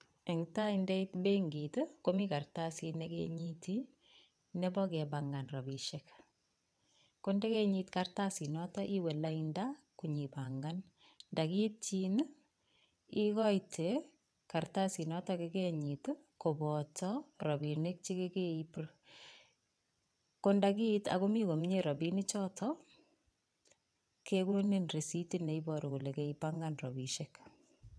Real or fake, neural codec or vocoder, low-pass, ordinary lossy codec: fake; vocoder, 22.05 kHz, 80 mel bands, WaveNeXt; none; none